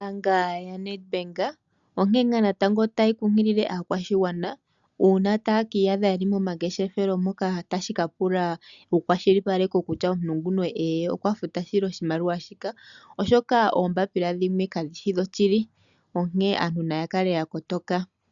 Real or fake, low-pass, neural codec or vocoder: real; 7.2 kHz; none